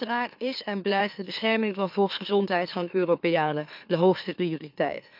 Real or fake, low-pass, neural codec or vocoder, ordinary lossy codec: fake; 5.4 kHz; autoencoder, 44.1 kHz, a latent of 192 numbers a frame, MeloTTS; none